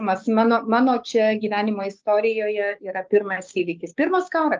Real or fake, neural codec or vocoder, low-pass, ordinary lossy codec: fake; codec, 16 kHz, 6 kbps, DAC; 7.2 kHz; Opus, 24 kbps